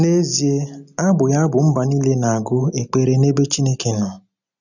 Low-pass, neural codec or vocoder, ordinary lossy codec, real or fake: 7.2 kHz; none; none; real